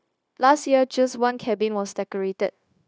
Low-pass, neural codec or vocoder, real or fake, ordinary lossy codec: none; codec, 16 kHz, 0.9 kbps, LongCat-Audio-Codec; fake; none